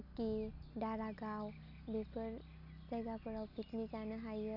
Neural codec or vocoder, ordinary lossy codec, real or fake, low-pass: none; none; real; 5.4 kHz